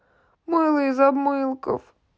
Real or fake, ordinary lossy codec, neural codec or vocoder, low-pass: real; none; none; none